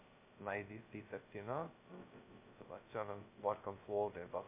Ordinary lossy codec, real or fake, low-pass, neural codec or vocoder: MP3, 24 kbps; fake; 3.6 kHz; codec, 16 kHz, 0.2 kbps, FocalCodec